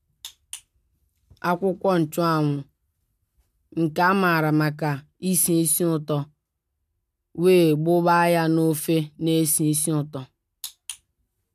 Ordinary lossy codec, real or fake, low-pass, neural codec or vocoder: none; real; 14.4 kHz; none